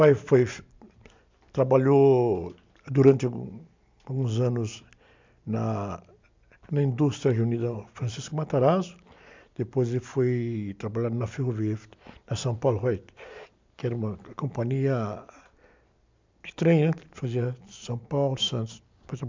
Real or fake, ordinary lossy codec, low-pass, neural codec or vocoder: real; MP3, 64 kbps; 7.2 kHz; none